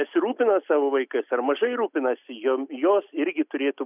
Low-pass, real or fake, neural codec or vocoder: 3.6 kHz; real; none